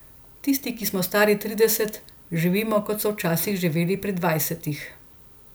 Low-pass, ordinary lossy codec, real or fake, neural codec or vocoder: none; none; real; none